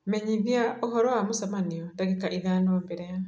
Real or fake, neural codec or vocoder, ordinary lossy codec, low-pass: real; none; none; none